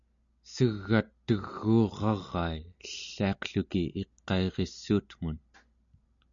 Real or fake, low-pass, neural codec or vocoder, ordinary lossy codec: real; 7.2 kHz; none; MP3, 96 kbps